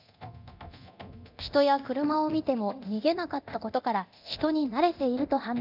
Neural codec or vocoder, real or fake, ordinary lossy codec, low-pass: codec, 24 kHz, 0.9 kbps, DualCodec; fake; none; 5.4 kHz